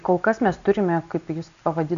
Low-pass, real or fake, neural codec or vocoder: 7.2 kHz; real; none